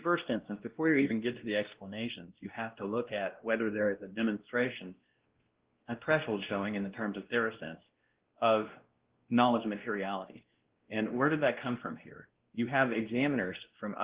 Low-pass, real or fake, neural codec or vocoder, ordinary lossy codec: 3.6 kHz; fake; codec, 16 kHz, 1 kbps, X-Codec, WavLM features, trained on Multilingual LibriSpeech; Opus, 16 kbps